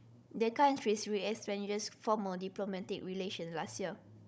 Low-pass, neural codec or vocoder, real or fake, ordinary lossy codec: none; codec, 16 kHz, 16 kbps, FunCodec, trained on Chinese and English, 50 frames a second; fake; none